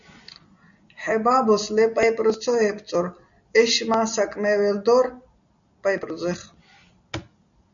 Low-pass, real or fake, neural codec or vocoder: 7.2 kHz; real; none